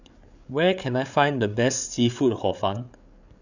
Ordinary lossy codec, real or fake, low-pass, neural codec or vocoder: none; fake; 7.2 kHz; codec, 16 kHz, 8 kbps, FreqCodec, larger model